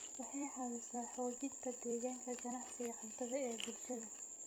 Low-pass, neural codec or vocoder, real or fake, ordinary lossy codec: none; vocoder, 44.1 kHz, 128 mel bands every 256 samples, BigVGAN v2; fake; none